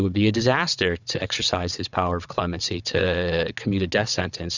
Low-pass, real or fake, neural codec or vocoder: 7.2 kHz; fake; vocoder, 22.05 kHz, 80 mel bands, WaveNeXt